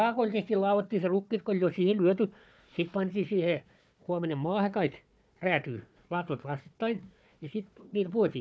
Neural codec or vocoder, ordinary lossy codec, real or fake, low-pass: codec, 16 kHz, 4 kbps, FunCodec, trained on Chinese and English, 50 frames a second; none; fake; none